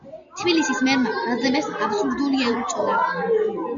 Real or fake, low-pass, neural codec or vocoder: real; 7.2 kHz; none